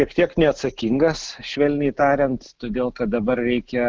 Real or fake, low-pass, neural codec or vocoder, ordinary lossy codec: real; 7.2 kHz; none; Opus, 16 kbps